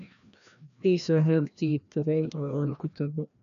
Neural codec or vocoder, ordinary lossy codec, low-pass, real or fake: codec, 16 kHz, 1 kbps, FreqCodec, larger model; none; 7.2 kHz; fake